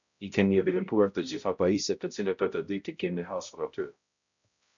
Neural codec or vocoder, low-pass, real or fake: codec, 16 kHz, 0.5 kbps, X-Codec, HuBERT features, trained on balanced general audio; 7.2 kHz; fake